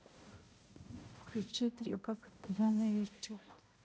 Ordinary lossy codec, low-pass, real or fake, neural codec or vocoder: none; none; fake; codec, 16 kHz, 0.5 kbps, X-Codec, HuBERT features, trained on balanced general audio